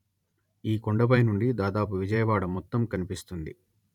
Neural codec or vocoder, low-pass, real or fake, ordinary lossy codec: vocoder, 44.1 kHz, 128 mel bands every 512 samples, BigVGAN v2; 19.8 kHz; fake; none